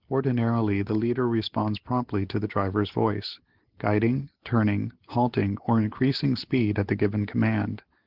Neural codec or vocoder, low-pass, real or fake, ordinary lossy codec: none; 5.4 kHz; real; Opus, 16 kbps